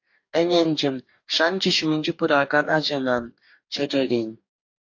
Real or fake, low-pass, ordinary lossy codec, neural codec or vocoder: fake; 7.2 kHz; AAC, 48 kbps; codec, 44.1 kHz, 2.6 kbps, DAC